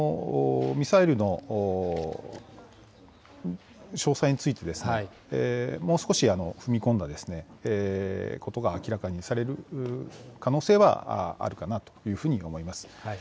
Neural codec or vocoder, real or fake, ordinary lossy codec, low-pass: none; real; none; none